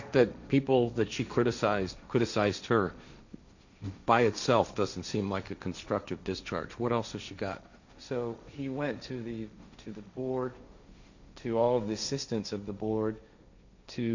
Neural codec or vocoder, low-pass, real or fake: codec, 16 kHz, 1.1 kbps, Voila-Tokenizer; 7.2 kHz; fake